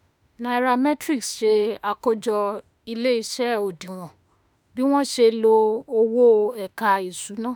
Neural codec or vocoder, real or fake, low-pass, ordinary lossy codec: autoencoder, 48 kHz, 32 numbers a frame, DAC-VAE, trained on Japanese speech; fake; none; none